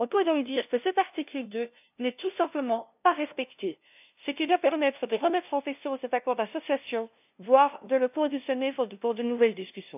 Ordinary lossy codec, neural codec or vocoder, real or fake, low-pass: none; codec, 16 kHz, 0.5 kbps, FunCodec, trained on LibriTTS, 25 frames a second; fake; 3.6 kHz